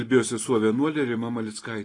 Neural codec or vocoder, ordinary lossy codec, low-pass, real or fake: none; AAC, 32 kbps; 10.8 kHz; real